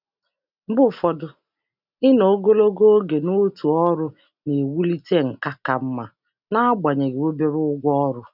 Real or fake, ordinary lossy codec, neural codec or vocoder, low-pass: real; none; none; 5.4 kHz